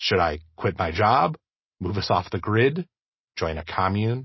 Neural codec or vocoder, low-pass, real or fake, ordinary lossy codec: none; 7.2 kHz; real; MP3, 24 kbps